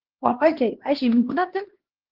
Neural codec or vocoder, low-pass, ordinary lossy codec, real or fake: codec, 16 kHz, 1 kbps, X-Codec, HuBERT features, trained on LibriSpeech; 5.4 kHz; Opus, 16 kbps; fake